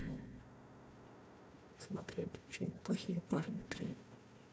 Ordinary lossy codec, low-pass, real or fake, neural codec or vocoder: none; none; fake; codec, 16 kHz, 1 kbps, FunCodec, trained on Chinese and English, 50 frames a second